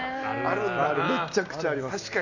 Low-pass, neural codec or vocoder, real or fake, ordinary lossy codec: 7.2 kHz; none; real; none